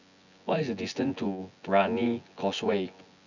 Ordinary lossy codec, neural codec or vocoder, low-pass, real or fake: none; vocoder, 24 kHz, 100 mel bands, Vocos; 7.2 kHz; fake